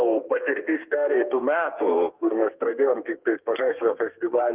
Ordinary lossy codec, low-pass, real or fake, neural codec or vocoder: Opus, 24 kbps; 3.6 kHz; fake; codec, 32 kHz, 1.9 kbps, SNAC